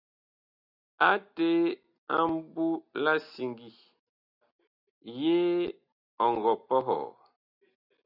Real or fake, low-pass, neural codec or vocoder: real; 5.4 kHz; none